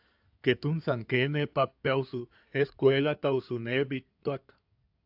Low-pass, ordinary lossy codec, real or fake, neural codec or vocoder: 5.4 kHz; AAC, 48 kbps; fake; codec, 16 kHz in and 24 kHz out, 2.2 kbps, FireRedTTS-2 codec